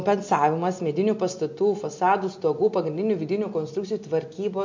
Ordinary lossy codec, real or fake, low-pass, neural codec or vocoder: MP3, 48 kbps; real; 7.2 kHz; none